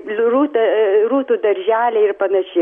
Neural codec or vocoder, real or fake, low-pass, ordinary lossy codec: none; real; 10.8 kHz; MP3, 48 kbps